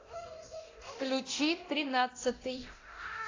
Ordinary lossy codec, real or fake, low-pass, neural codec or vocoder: AAC, 32 kbps; fake; 7.2 kHz; codec, 24 kHz, 0.9 kbps, DualCodec